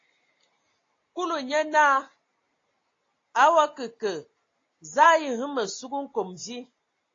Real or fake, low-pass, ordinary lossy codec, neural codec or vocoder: real; 7.2 kHz; AAC, 32 kbps; none